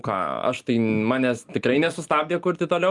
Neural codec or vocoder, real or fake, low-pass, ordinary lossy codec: none; real; 10.8 kHz; Opus, 32 kbps